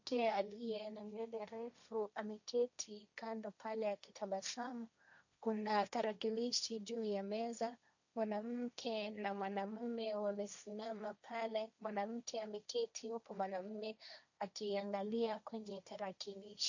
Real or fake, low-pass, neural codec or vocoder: fake; 7.2 kHz; codec, 16 kHz, 1.1 kbps, Voila-Tokenizer